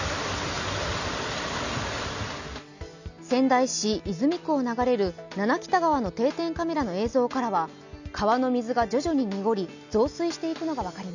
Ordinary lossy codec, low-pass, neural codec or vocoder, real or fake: none; 7.2 kHz; none; real